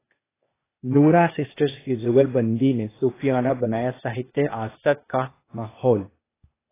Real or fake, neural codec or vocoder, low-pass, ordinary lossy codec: fake; codec, 16 kHz, 0.8 kbps, ZipCodec; 3.6 kHz; AAC, 16 kbps